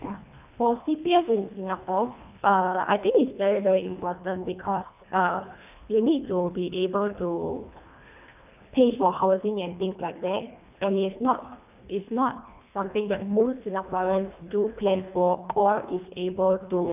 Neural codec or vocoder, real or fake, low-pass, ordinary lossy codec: codec, 24 kHz, 1.5 kbps, HILCodec; fake; 3.6 kHz; none